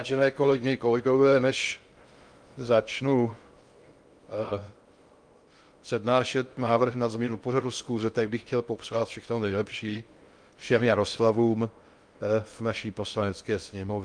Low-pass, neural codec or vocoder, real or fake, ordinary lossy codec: 9.9 kHz; codec, 16 kHz in and 24 kHz out, 0.6 kbps, FocalCodec, streaming, 2048 codes; fake; Opus, 32 kbps